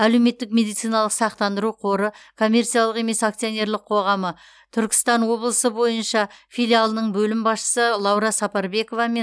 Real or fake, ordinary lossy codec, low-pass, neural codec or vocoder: real; none; none; none